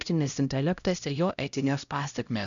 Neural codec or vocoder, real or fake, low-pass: codec, 16 kHz, 0.5 kbps, X-Codec, HuBERT features, trained on LibriSpeech; fake; 7.2 kHz